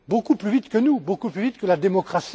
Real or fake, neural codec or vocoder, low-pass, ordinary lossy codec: real; none; none; none